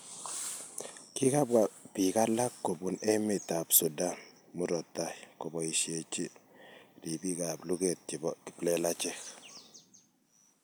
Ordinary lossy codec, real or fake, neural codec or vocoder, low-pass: none; real; none; none